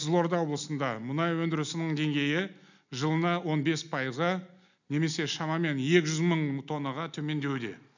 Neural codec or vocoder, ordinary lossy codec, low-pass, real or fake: none; none; 7.2 kHz; real